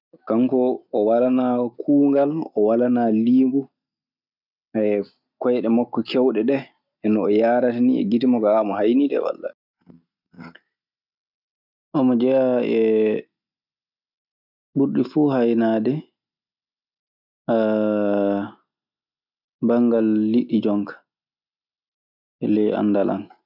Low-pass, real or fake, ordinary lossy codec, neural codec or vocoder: 5.4 kHz; real; none; none